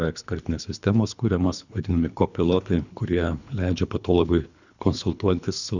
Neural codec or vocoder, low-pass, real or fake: codec, 24 kHz, 3 kbps, HILCodec; 7.2 kHz; fake